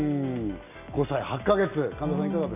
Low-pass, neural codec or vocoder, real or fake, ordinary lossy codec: 3.6 kHz; none; real; none